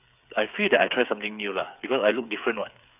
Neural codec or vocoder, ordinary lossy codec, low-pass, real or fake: codec, 16 kHz, 8 kbps, FreqCodec, smaller model; none; 3.6 kHz; fake